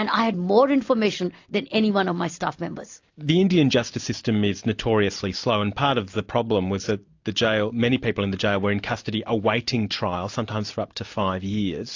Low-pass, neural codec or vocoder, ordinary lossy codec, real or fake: 7.2 kHz; none; AAC, 48 kbps; real